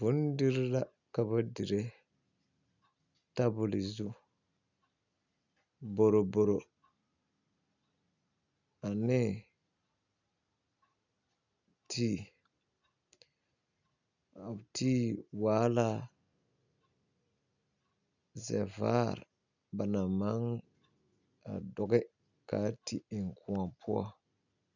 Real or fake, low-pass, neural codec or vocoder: real; 7.2 kHz; none